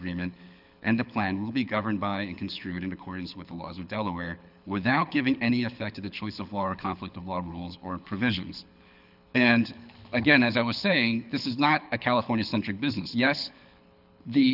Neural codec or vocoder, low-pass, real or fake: codec, 24 kHz, 6 kbps, HILCodec; 5.4 kHz; fake